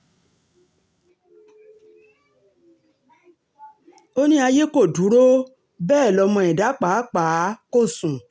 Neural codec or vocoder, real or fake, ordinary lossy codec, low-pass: none; real; none; none